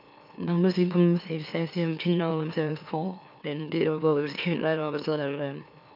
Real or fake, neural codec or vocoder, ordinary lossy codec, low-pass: fake; autoencoder, 44.1 kHz, a latent of 192 numbers a frame, MeloTTS; none; 5.4 kHz